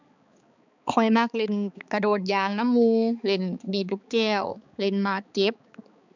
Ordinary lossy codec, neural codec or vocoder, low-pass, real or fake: none; codec, 16 kHz, 4 kbps, X-Codec, HuBERT features, trained on balanced general audio; 7.2 kHz; fake